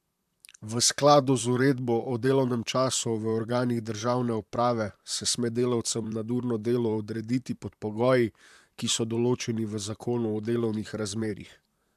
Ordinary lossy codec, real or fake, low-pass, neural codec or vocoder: AAC, 96 kbps; fake; 14.4 kHz; vocoder, 44.1 kHz, 128 mel bands, Pupu-Vocoder